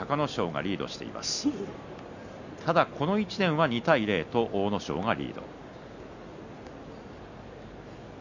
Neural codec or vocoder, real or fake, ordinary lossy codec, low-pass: none; real; none; 7.2 kHz